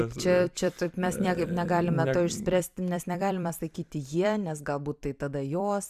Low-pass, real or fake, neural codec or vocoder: 14.4 kHz; fake; vocoder, 44.1 kHz, 128 mel bands every 256 samples, BigVGAN v2